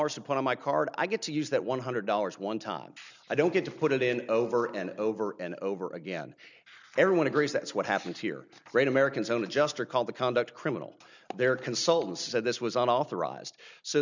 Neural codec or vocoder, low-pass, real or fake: none; 7.2 kHz; real